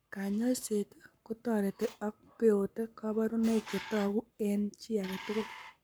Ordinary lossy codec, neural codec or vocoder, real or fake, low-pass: none; codec, 44.1 kHz, 7.8 kbps, Pupu-Codec; fake; none